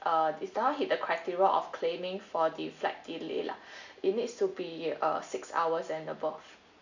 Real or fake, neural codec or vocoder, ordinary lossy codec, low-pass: real; none; none; 7.2 kHz